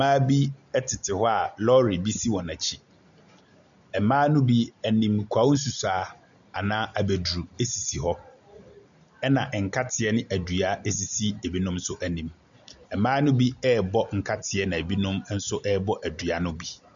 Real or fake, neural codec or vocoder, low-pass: real; none; 7.2 kHz